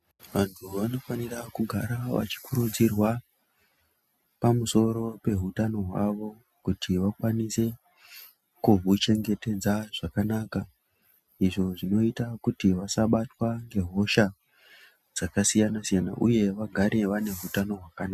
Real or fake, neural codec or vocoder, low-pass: real; none; 14.4 kHz